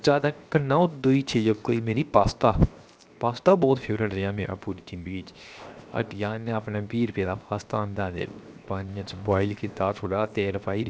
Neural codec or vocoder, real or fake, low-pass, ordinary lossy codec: codec, 16 kHz, 0.7 kbps, FocalCodec; fake; none; none